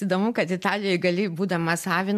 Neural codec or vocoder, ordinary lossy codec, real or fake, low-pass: none; AAC, 96 kbps; real; 14.4 kHz